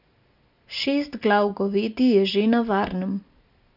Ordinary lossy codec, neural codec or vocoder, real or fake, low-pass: none; none; real; 5.4 kHz